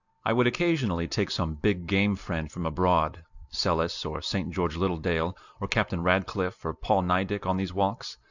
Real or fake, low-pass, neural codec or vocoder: real; 7.2 kHz; none